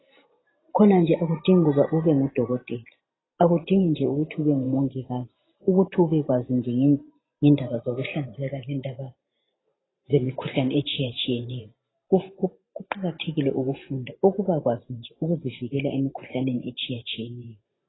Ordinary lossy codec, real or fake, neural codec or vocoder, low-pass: AAC, 16 kbps; fake; vocoder, 44.1 kHz, 128 mel bands every 512 samples, BigVGAN v2; 7.2 kHz